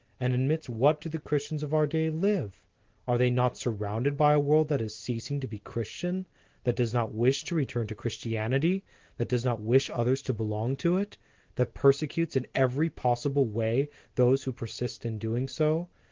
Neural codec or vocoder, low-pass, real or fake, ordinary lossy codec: none; 7.2 kHz; real; Opus, 16 kbps